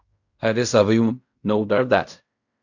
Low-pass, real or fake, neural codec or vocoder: 7.2 kHz; fake; codec, 16 kHz in and 24 kHz out, 0.4 kbps, LongCat-Audio-Codec, fine tuned four codebook decoder